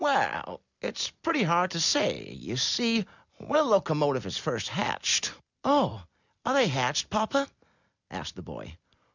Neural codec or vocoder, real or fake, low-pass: none; real; 7.2 kHz